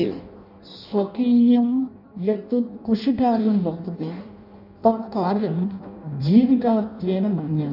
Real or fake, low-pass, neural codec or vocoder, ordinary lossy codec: fake; 5.4 kHz; codec, 16 kHz in and 24 kHz out, 0.6 kbps, FireRedTTS-2 codec; none